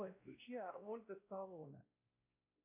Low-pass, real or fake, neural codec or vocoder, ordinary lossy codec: 3.6 kHz; fake; codec, 16 kHz, 1 kbps, X-Codec, WavLM features, trained on Multilingual LibriSpeech; AAC, 32 kbps